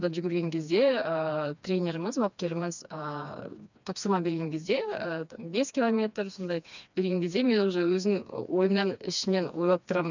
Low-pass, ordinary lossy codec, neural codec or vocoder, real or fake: 7.2 kHz; none; codec, 16 kHz, 2 kbps, FreqCodec, smaller model; fake